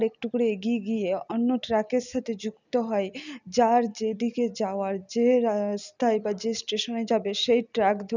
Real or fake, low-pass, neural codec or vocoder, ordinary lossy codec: real; 7.2 kHz; none; none